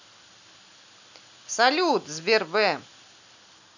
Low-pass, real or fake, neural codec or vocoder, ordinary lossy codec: 7.2 kHz; real; none; none